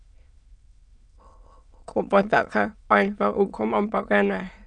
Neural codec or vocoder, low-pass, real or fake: autoencoder, 22.05 kHz, a latent of 192 numbers a frame, VITS, trained on many speakers; 9.9 kHz; fake